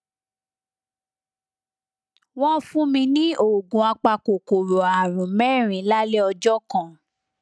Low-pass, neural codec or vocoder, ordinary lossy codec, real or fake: none; none; none; real